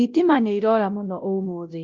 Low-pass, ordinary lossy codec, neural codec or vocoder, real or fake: 7.2 kHz; Opus, 32 kbps; codec, 16 kHz, 0.5 kbps, X-Codec, WavLM features, trained on Multilingual LibriSpeech; fake